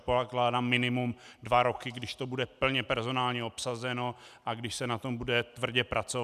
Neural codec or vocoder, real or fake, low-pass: none; real; 14.4 kHz